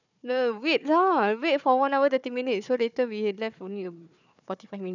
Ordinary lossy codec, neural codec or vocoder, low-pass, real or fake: none; codec, 16 kHz, 4 kbps, FunCodec, trained on Chinese and English, 50 frames a second; 7.2 kHz; fake